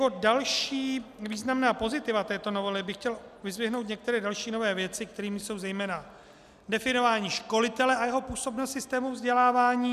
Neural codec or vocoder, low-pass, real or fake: none; 14.4 kHz; real